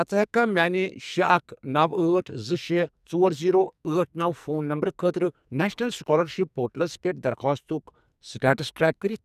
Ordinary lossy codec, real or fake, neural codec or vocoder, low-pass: none; fake; codec, 44.1 kHz, 2.6 kbps, SNAC; 14.4 kHz